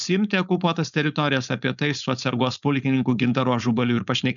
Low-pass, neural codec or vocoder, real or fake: 7.2 kHz; codec, 16 kHz, 4.8 kbps, FACodec; fake